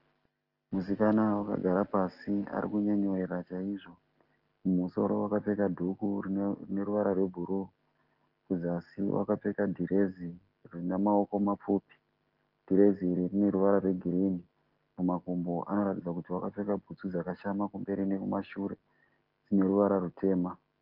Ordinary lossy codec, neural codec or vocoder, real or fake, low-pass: Opus, 16 kbps; none; real; 5.4 kHz